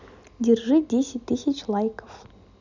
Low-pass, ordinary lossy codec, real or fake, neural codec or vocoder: 7.2 kHz; none; real; none